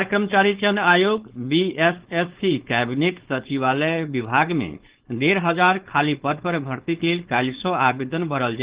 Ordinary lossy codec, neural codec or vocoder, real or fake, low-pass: Opus, 16 kbps; codec, 16 kHz, 4.8 kbps, FACodec; fake; 3.6 kHz